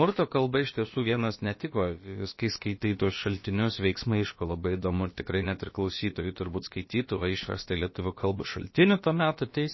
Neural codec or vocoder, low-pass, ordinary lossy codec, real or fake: codec, 16 kHz, about 1 kbps, DyCAST, with the encoder's durations; 7.2 kHz; MP3, 24 kbps; fake